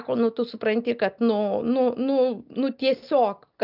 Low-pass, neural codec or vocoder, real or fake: 5.4 kHz; none; real